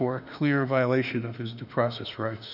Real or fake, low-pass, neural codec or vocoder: fake; 5.4 kHz; autoencoder, 48 kHz, 32 numbers a frame, DAC-VAE, trained on Japanese speech